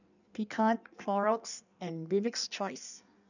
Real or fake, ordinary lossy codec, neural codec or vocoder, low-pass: fake; none; codec, 16 kHz in and 24 kHz out, 1.1 kbps, FireRedTTS-2 codec; 7.2 kHz